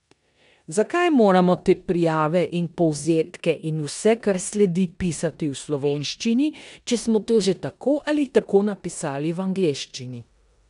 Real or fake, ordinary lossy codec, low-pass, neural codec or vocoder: fake; none; 10.8 kHz; codec, 16 kHz in and 24 kHz out, 0.9 kbps, LongCat-Audio-Codec, four codebook decoder